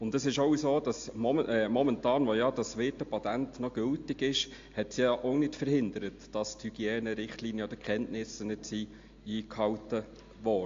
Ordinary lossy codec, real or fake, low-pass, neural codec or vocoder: AAC, 48 kbps; real; 7.2 kHz; none